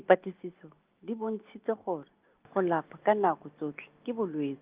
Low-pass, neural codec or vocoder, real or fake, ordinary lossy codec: 3.6 kHz; none; real; Opus, 24 kbps